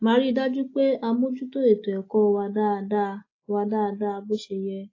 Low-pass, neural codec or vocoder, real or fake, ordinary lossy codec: 7.2 kHz; none; real; AAC, 32 kbps